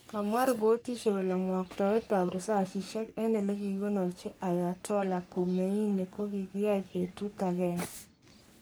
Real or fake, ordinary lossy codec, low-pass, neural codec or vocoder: fake; none; none; codec, 44.1 kHz, 3.4 kbps, Pupu-Codec